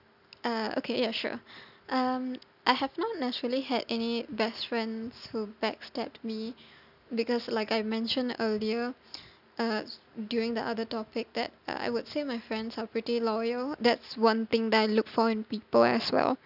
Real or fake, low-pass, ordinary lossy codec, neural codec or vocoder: real; 5.4 kHz; none; none